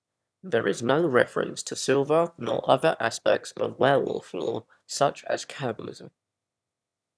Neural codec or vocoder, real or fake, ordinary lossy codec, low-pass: autoencoder, 22.05 kHz, a latent of 192 numbers a frame, VITS, trained on one speaker; fake; none; none